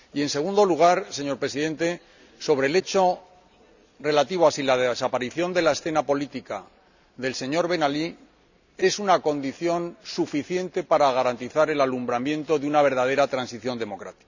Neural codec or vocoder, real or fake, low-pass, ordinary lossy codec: none; real; 7.2 kHz; none